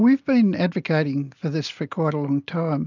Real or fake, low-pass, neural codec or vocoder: real; 7.2 kHz; none